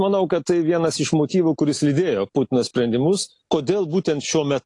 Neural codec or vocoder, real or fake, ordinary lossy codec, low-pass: none; real; AAC, 48 kbps; 10.8 kHz